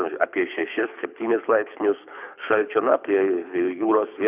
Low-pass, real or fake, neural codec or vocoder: 3.6 kHz; fake; codec, 24 kHz, 6 kbps, HILCodec